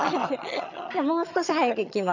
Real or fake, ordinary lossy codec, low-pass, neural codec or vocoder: fake; none; 7.2 kHz; vocoder, 22.05 kHz, 80 mel bands, HiFi-GAN